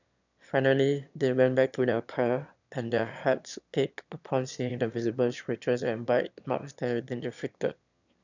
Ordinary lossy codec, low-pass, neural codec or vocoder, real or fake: none; 7.2 kHz; autoencoder, 22.05 kHz, a latent of 192 numbers a frame, VITS, trained on one speaker; fake